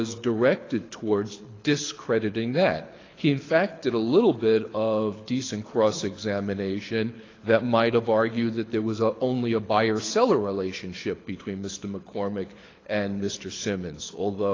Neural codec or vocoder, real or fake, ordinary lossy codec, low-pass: codec, 24 kHz, 6 kbps, HILCodec; fake; AAC, 32 kbps; 7.2 kHz